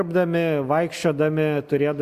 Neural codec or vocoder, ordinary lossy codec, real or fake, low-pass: none; Opus, 64 kbps; real; 14.4 kHz